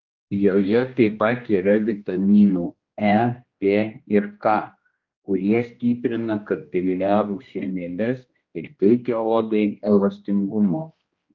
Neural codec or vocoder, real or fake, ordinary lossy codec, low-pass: codec, 16 kHz, 1 kbps, X-Codec, HuBERT features, trained on general audio; fake; Opus, 24 kbps; 7.2 kHz